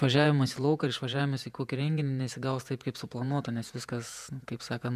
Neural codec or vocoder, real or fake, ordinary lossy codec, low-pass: vocoder, 48 kHz, 128 mel bands, Vocos; fake; MP3, 96 kbps; 14.4 kHz